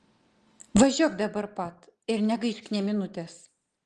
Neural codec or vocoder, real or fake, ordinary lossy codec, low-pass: none; real; Opus, 24 kbps; 10.8 kHz